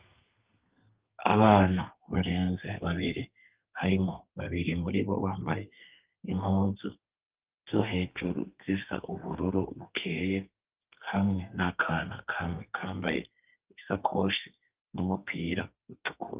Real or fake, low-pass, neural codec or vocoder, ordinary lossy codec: fake; 3.6 kHz; codec, 32 kHz, 1.9 kbps, SNAC; Opus, 24 kbps